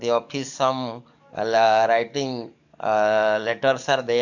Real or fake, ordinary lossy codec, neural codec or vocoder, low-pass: fake; none; codec, 44.1 kHz, 7.8 kbps, DAC; 7.2 kHz